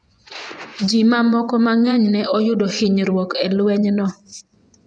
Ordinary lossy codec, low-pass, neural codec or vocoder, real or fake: none; 9.9 kHz; vocoder, 24 kHz, 100 mel bands, Vocos; fake